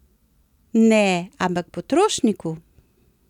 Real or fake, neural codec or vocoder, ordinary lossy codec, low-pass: real; none; none; 19.8 kHz